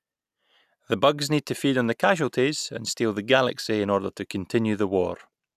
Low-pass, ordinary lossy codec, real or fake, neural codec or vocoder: 14.4 kHz; none; real; none